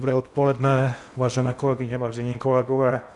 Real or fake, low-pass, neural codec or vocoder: fake; 10.8 kHz; codec, 16 kHz in and 24 kHz out, 0.8 kbps, FocalCodec, streaming, 65536 codes